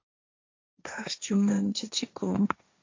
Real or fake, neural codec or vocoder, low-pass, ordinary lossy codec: fake; codec, 16 kHz, 1.1 kbps, Voila-Tokenizer; 7.2 kHz; MP3, 64 kbps